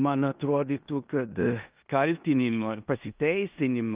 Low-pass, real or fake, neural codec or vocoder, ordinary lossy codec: 3.6 kHz; fake; codec, 16 kHz in and 24 kHz out, 0.9 kbps, LongCat-Audio-Codec, four codebook decoder; Opus, 32 kbps